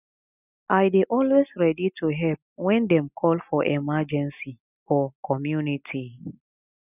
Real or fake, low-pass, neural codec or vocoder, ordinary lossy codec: real; 3.6 kHz; none; none